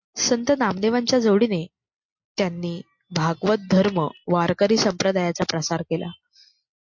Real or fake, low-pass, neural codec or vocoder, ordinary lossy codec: real; 7.2 kHz; none; MP3, 48 kbps